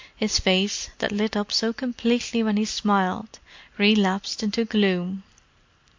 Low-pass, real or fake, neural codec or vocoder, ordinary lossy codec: 7.2 kHz; real; none; MP3, 48 kbps